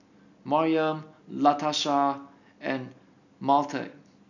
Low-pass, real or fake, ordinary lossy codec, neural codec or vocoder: 7.2 kHz; real; none; none